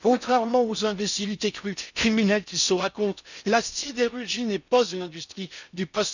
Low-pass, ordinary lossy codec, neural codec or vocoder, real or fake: 7.2 kHz; none; codec, 16 kHz in and 24 kHz out, 0.6 kbps, FocalCodec, streaming, 4096 codes; fake